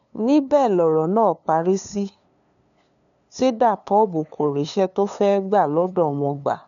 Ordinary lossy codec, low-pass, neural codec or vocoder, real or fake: none; 7.2 kHz; codec, 16 kHz, 2 kbps, FunCodec, trained on LibriTTS, 25 frames a second; fake